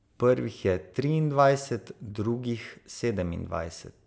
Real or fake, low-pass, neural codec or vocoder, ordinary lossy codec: real; none; none; none